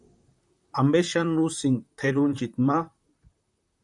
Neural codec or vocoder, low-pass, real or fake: vocoder, 44.1 kHz, 128 mel bands, Pupu-Vocoder; 10.8 kHz; fake